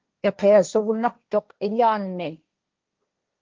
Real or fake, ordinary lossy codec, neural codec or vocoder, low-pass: fake; Opus, 32 kbps; codec, 16 kHz, 1.1 kbps, Voila-Tokenizer; 7.2 kHz